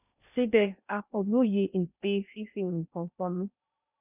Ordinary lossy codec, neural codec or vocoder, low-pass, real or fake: none; codec, 16 kHz in and 24 kHz out, 0.6 kbps, FocalCodec, streaming, 2048 codes; 3.6 kHz; fake